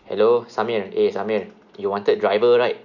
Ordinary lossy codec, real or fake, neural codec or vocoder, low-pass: none; real; none; 7.2 kHz